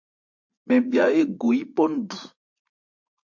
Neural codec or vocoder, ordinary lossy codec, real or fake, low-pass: vocoder, 44.1 kHz, 80 mel bands, Vocos; MP3, 48 kbps; fake; 7.2 kHz